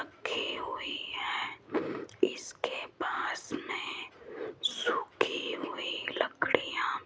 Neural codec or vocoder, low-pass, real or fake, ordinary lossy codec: none; none; real; none